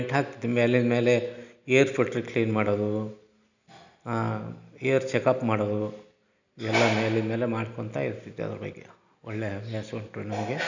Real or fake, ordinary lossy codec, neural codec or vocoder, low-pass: real; none; none; 7.2 kHz